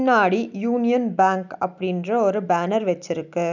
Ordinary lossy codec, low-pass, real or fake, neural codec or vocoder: none; 7.2 kHz; real; none